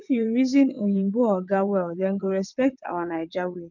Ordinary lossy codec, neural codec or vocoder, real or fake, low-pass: none; vocoder, 22.05 kHz, 80 mel bands, WaveNeXt; fake; 7.2 kHz